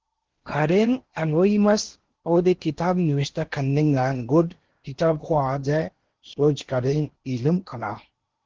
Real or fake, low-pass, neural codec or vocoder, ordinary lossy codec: fake; 7.2 kHz; codec, 16 kHz in and 24 kHz out, 0.8 kbps, FocalCodec, streaming, 65536 codes; Opus, 16 kbps